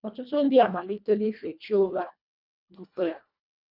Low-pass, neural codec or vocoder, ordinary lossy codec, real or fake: 5.4 kHz; codec, 24 kHz, 1.5 kbps, HILCodec; none; fake